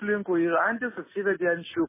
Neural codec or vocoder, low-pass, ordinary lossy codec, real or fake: none; 3.6 kHz; MP3, 16 kbps; real